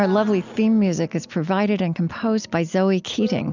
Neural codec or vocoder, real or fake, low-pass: none; real; 7.2 kHz